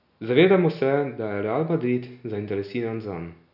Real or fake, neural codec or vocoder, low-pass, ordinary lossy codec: real; none; 5.4 kHz; none